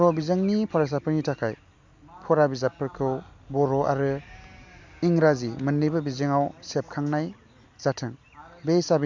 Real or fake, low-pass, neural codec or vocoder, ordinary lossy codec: real; 7.2 kHz; none; MP3, 64 kbps